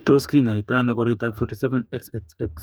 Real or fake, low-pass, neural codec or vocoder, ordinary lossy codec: fake; none; codec, 44.1 kHz, 2.6 kbps, DAC; none